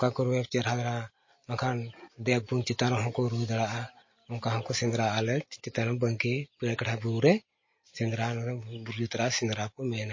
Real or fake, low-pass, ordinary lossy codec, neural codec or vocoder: real; 7.2 kHz; MP3, 32 kbps; none